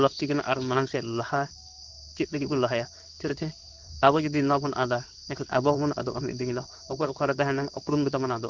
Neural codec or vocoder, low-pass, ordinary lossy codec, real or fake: codec, 16 kHz in and 24 kHz out, 1 kbps, XY-Tokenizer; 7.2 kHz; Opus, 32 kbps; fake